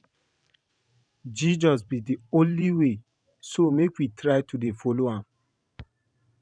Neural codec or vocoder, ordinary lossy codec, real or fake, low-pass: vocoder, 44.1 kHz, 128 mel bands every 512 samples, BigVGAN v2; none; fake; 9.9 kHz